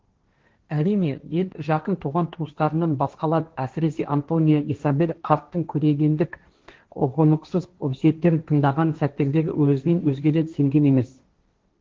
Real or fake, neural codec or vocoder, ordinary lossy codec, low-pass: fake; codec, 16 kHz, 1.1 kbps, Voila-Tokenizer; Opus, 16 kbps; 7.2 kHz